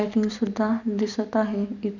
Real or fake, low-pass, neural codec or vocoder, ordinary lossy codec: real; 7.2 kHz; none; none